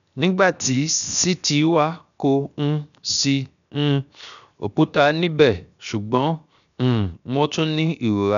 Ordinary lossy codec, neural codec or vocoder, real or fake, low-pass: none; codec, 16 kHz, 0.7 kbps, FocalCodec; fake; 7.2 kHz